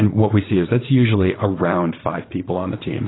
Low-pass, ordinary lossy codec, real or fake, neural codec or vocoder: 7.2 kHz; AAC, 16 kbps; fake; vocoder, 44.1 kHz, 80 mel bands, Vocos